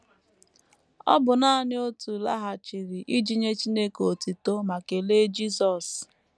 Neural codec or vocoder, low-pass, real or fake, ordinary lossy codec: none; none; real; none